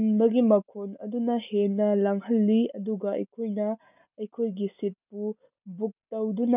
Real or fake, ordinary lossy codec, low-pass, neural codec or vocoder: real; none; 3.6 kHz; none